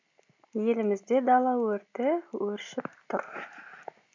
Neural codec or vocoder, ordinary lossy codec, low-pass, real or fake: none; MP3, 64 kbps; 7.2 kHz; real